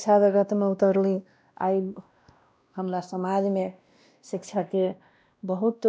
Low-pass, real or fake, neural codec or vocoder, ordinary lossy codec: none; fake; codec, 16 kHz, 1 kbps, X-Codec, WavLM features, trained on Multilingual LibriSpeech; none